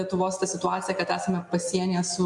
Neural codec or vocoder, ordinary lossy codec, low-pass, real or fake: none; MP3, 64 kbps; 10.8 kHz; real